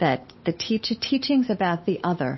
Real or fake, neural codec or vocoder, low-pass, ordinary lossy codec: fake; codec, 16 kHz, 8 kbps, FunCodec, trained on Chinese and English, 25 frames a second; 7.2 kHz; MP3, 24 kbps